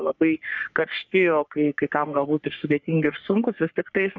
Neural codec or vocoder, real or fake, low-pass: codec, 44.1 kHz, 3.4 kbps, Pupu-Codec; fake; 7.2 kHz